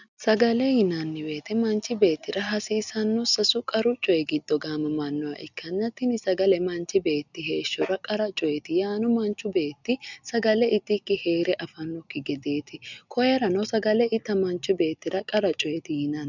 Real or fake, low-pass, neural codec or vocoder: real; 7.2 kHz; none